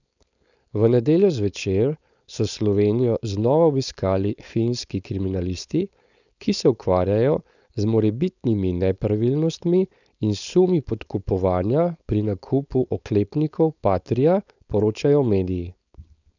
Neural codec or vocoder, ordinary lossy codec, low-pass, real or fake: codec, 16 kHz, 4.8 kbps, FACodec; none; 7.2 kHz; fake